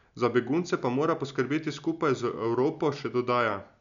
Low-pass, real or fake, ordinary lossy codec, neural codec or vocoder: 7.2 kHz; real; none; none